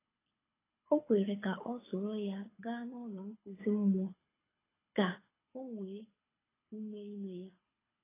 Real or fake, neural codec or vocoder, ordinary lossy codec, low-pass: fake; codec, 24 kHz, 6 kbps, HILCodec; AAC, 16 kbps; 3.6 kHz